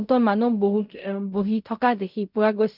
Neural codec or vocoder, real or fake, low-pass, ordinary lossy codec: codec, 16 kHz in and 24 kHz out, 0.4 kbps, LongCat-Audio-Codec, fine tuned four codebook decoder; fake; 5.4 kHz; none